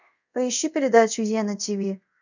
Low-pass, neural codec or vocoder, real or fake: 7.2 kHz; codec, 24 kHz, 0.5 kbps, DualCodec; fake